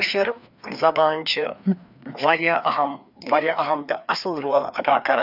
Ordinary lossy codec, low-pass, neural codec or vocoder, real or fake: none; 5.4 kHz; codec, 16 kHz, 2 kbps, FreqCodec, larger model; fake